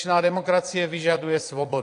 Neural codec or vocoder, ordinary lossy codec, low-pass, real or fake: vocoder, 22.05 kHz, 80 mel bands, Vocos; AAC, 48 kbps; 9.9 kHz; fake